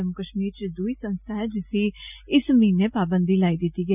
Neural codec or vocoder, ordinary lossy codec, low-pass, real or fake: none; none; 3.6 kHz; real